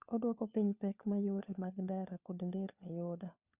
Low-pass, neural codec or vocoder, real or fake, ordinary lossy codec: 3.6 kHz; codec, 16 kHz, 4 kbps, FunCodec, trained on LibriTTS, 50 frames a second; fake; Opus, 64 kbps